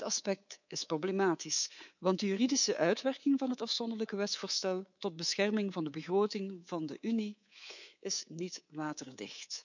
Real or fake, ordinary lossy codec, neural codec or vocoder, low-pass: fake; none; codec, 24 kHz, 3.1 kbps, DualCodec; 7.2 kHz